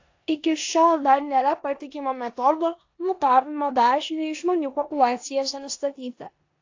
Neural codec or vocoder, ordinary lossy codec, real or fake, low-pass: codec, 16 kHz in and 24 kHz out, 0.9 kbps, LongCat-Audio-Codec, four codebook decoder; AAC, 48 kbps; fake; 7.2 kHz